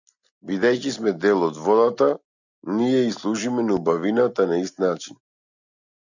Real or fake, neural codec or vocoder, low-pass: real; none; 7.2 kHz